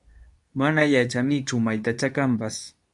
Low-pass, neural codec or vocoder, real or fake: 10.8 kHz; codec, 24 kHz, 0.9 kbps, WavTokenizer, medium speech release version 2; fake